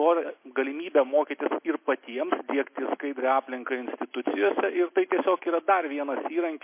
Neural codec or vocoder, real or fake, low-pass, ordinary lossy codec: none; real; 3.6 kHz; MP3, 24 kbps